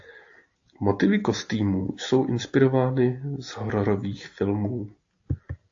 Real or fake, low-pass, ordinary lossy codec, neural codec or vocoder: real; 7.2 kHz; AAC, 48 kbps; none